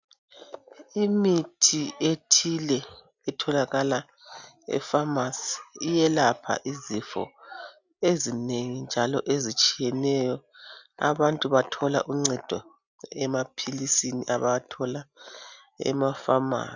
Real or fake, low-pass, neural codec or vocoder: real; 7.2 kHz; none